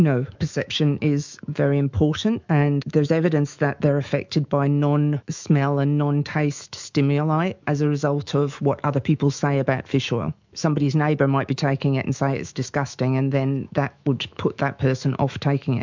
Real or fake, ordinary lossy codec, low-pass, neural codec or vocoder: fake; MP3, 64 kbps; 7.2 kHz; autoencoder, 48 kHz, 128 numbers a frame, DAC-VAE, trained on Japanese speech